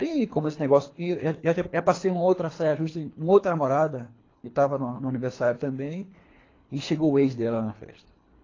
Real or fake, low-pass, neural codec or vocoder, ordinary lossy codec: fake; 7.2 kHz; codec, 24 kHz, 3 kbps, HILCodec; AAC, 32 kbps